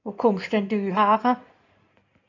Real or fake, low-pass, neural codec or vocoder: fake; 7.2 kHz; codec, 16 kHz in and 24 kHz out, 2.2 kbps, FireRedTTS-2 codec